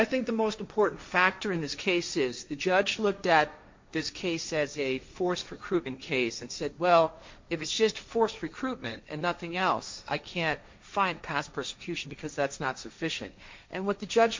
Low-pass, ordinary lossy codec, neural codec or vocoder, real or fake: 7.2 kHz; MP3, 48 kbps; codec, 16 kHz, 1.1 kbps, Voila-Tokenizer; fake